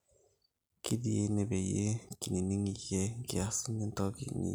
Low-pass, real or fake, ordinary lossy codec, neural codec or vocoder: none; real; none; none